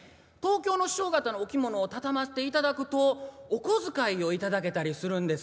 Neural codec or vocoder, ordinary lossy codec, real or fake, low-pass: none; none; real; none